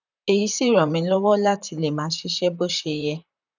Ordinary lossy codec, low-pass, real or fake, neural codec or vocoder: none; 7.2 kHz; fake; vocoder, 44.1 kHz, 128 mel bands, Pupu-Vocoder